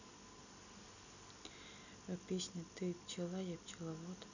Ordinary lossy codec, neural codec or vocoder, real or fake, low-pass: none; none; real; 7.2 kHz